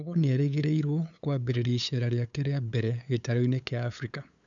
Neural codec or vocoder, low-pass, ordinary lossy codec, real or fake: codec, 16 kHz, 8 kbps, FunCodec, trained on LibriTTS, 25 frames a second; 7.2 kHz; none; fake